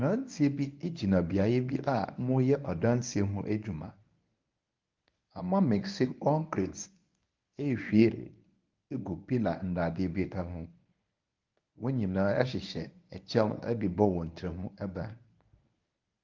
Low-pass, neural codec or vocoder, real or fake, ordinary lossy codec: 7.2 kHz; codec, 24 kHz, 0.9 kbps, WavTokenizer, medium speech release version 1; fake; Opus, 24 kbps